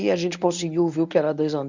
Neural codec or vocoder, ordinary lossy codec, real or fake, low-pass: codec, 24 kHz, 0.9 kbps, WavTokenizer, medium speech release version 1; none; fake; 7.2 kHz